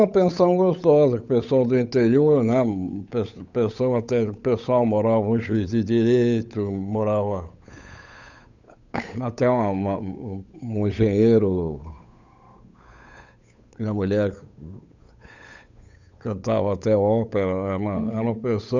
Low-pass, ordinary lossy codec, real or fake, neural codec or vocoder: 7.2 kHz; none; fake; codec, 16 kHz, 8 kbps, FunCodec, trained on Chinese and English, 25 frames a second